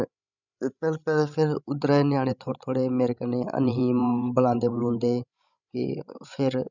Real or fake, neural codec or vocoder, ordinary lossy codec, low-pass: fake; codec, 16 kHz, 16 kbps, FreqCodec, larger model; none; 7.2 kHz